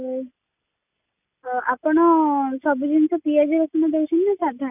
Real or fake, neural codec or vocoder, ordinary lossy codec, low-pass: real; none; none; 3.6 kHz